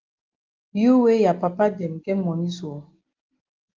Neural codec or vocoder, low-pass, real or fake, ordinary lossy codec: none; 7.2 kHz; real; Opus, 16 kbps